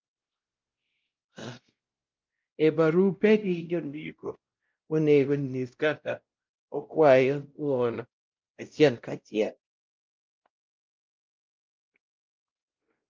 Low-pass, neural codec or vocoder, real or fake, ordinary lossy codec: 7.2 kHz; codec, 16 kHz, 0.5 kbps, X-Codec, WavLM features, trained on Multilingual LibriSpeech; fake; Opus, 24 kbps